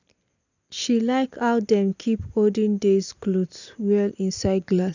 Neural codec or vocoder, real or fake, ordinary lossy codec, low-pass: none; real; none; 7.2 kHz